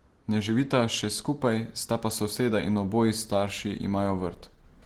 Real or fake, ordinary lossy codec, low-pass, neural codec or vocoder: real; Opus, 16 kbps; 19.8 kHz; none